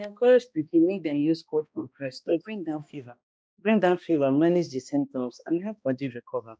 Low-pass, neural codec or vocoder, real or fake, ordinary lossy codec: none; codec, 16 kHz, 1 kbps, X-Codec, HuBERT features, trained on balanced general audio; fake; none